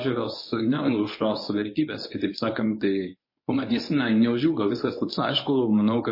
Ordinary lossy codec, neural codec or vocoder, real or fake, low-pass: MP3, 24 kbps; codec, 24 kHz, 0.9 kbps, WavTokenizer, medium speech release version 1; fake; 5.4 kHz